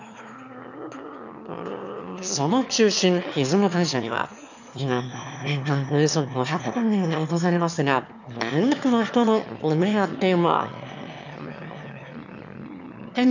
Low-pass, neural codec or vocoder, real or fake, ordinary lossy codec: 7.2 kHz; autoencoder, 22.05 kHz, a latent of 192 numbers a frame, VITS, trained on one speaker; fake; none